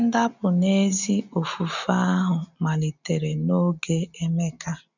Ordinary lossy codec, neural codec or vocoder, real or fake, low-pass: none; none; real; 7.2 kHz